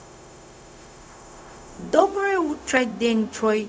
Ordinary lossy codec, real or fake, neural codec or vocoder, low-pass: none; fake; codec, 16 kHz, 0.4 kbps, LongCat-Audio-Codec; none